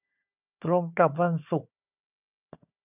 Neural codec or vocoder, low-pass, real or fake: codec, 16 kHz, 4 kbps, FunCodec, trained on Chinese and English, 50 frames a second; 3.6 kHz; fake